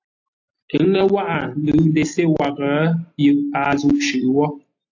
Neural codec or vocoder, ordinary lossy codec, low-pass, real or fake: none; AAC, 48 kbps; 7.2 kHz; real